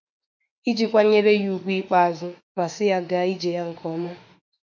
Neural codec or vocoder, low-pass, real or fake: autoencoder, 48 kHz, 32 numbers a frame, DAC-VAE, trained on Japanese speech; 7.2 kHz; fake